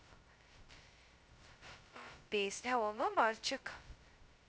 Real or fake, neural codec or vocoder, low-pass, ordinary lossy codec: fake; codec, 16 kHz, 0.2 kbps, FocalCodec; none; none